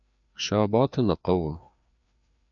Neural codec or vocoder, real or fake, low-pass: codec, 16 kHz, 2 kbps, FreqCodec, larger model; fake; 7.2 kHz